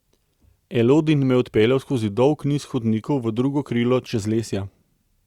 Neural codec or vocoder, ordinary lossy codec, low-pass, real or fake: none; Opus, 64 kbps; 19.8 kHz; real